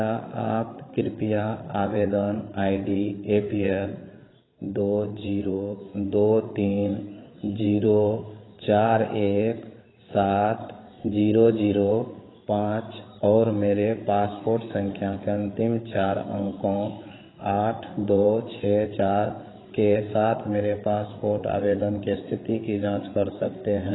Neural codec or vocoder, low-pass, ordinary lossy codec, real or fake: codec, 16 kHz, 8 kbps, FreqCodec, larger model; 7.2 kHz; AAC, 16 kbps; fake